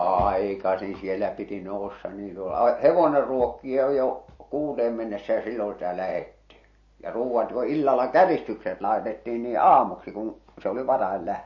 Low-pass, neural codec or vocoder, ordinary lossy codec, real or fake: 7.2 kHz; none; MP3, 32 kbps; real